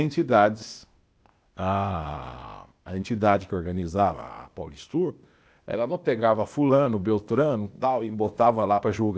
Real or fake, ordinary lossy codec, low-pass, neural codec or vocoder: fake; none; none; codec, 16 kHz, 0.8 kbps, ZipCodec